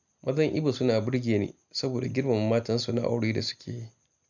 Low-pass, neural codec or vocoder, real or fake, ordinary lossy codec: 7.2 kHz; none; real; none